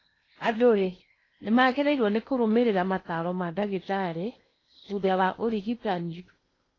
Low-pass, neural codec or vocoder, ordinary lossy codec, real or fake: 7.2 kHz; codec, 16 kHz in and 24 kHz out, 0.8 kbps, FocalCodec, streaming, 65536 codes; AAC, 32 kbps; fake